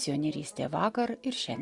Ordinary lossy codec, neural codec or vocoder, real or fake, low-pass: Opus, 64 kbps; none; real; 10.8 kHz